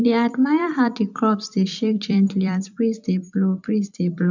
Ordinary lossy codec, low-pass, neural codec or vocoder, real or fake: none; 7.2 kHz; vocoder, 44.1 kHz, 128 mel bands every 256 samples, BigVGAN v2; fake